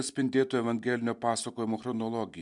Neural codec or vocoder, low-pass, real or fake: none; 10.8 kHz; real